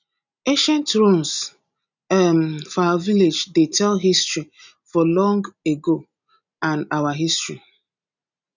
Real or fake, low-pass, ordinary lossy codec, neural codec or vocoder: real; 7.2 kHz; none; none